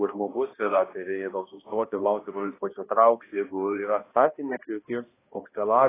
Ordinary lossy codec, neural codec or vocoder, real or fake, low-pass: AAC, 16 kbps; codec, 16 kHz, 1 kbps, X-Codec, HuBERT features, trained on balanced general audio; fake; 3.6 kHz